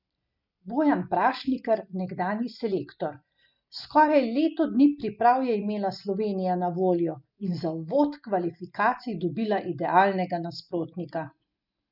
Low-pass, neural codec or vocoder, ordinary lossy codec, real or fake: 5.4 kHz; none; none; real